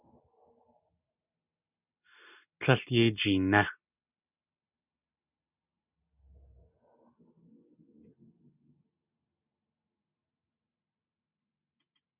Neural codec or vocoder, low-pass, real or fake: none; 3.6 kHz; real